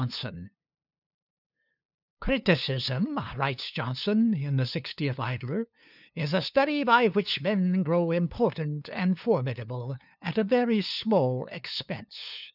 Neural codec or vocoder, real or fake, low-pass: codec, 16 kHz, 2 kbps, FunCodec, trained on LibriTTS, 25 frames a second; fake; 5.4 kHz